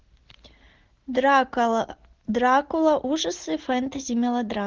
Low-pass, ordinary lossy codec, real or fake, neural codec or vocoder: 7.2 kHz; Opus, 16 kbps; real; none